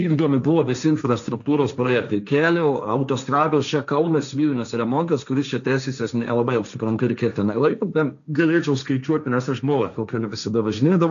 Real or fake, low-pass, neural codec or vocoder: fake; 7.2 kHz; codec, 16 kHz, 1.1 kbps, Voila-Tokenizer